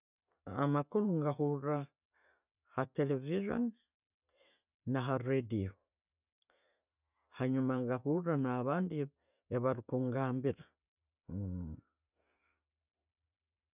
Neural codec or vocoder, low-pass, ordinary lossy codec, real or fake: none; 3.6 kHz; none; real